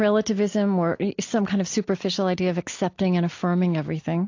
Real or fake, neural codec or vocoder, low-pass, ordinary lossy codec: real; none; 7.2 kHz; AAC, 48 kbps